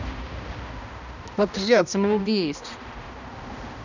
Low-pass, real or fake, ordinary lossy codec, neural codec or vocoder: 7.2 kHz; fake; none; codec, 16 kHz, 1 kbps, X-Codec, HuBERT features, trained on balanced general audio